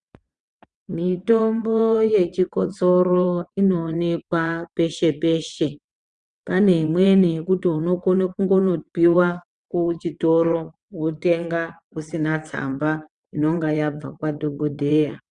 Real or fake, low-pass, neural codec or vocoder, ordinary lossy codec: fake; 9.9 kHz; vocoder, 22.05 kHz, 80 mel bands, WaveNeXt; Opus, 64 kbps